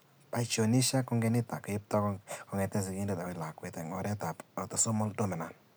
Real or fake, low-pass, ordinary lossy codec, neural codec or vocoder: real; none; none; none